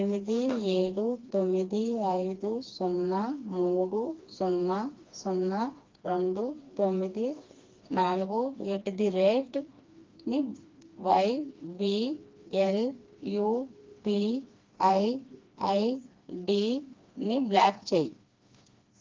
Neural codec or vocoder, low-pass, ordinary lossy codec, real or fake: codec, 16 kHz, 2 kbps, FreqCodec, smaller model; 7.2 kHz; Opus, 32 kbps; fake